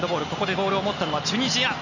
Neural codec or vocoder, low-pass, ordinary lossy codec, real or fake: none; 7.2 kHz; none; real